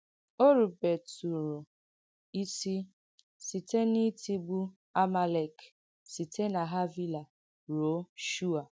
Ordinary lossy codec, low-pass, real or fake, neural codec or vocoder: none; none; real; none